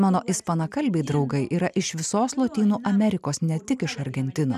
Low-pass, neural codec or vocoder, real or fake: 14.4 kHz; none; real